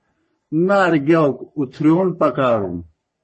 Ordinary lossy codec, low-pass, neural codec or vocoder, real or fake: MP3, 32 kbps; 10.8 kHz; codec, 44.1 kHz, 3.4 kbps, Pupu-Codec; fake